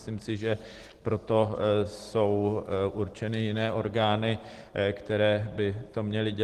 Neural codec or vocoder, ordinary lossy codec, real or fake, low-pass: none; Opus, 16 kbps; real; 14.4 kHz